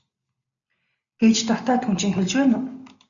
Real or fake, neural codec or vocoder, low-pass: real; none; 7.2 kHz